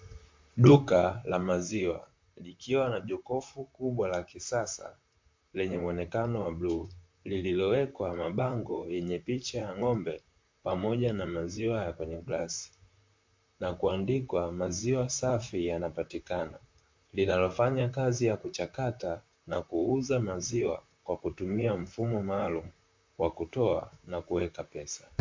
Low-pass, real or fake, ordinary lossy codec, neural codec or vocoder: 7.2 kHz; fake; MP3, 48 kbps; vocoder, 44.1 kHz, 128 mel bands, Pupu-Vocoder